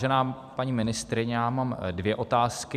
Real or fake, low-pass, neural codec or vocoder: fake; 14.4 kHz; vocoder, 44.1 kHz, 128 mel bands every 256 samples, BigVGAN v2